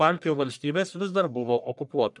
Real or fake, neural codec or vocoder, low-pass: fake; codec, 44.1 kHz, 1.7 kbps, Pupu-Codec; 10.8 kHz